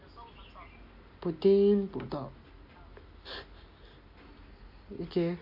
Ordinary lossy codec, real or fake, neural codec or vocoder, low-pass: MP3, 32 kbps; real; none; 5.4 kHz